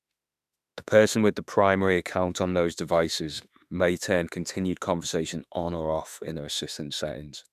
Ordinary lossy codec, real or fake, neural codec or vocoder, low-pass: none; fake; autoencoder, 48 kHz, 32 numbers a frame, DAC-VAE, trained on Japanese speech; 14.4 kHz